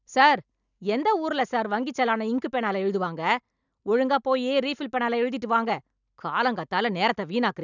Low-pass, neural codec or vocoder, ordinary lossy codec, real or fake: 7.2 kHz; none; none; real